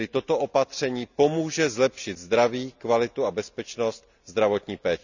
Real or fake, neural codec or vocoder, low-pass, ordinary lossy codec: real; none; 7.2 kHz; none